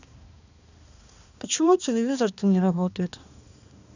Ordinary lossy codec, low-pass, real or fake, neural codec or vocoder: none; 7.2 kHz; fake; codec, 16 kHz, 2 kbps, X-Codec, HuBERT features, trained on balanced general audio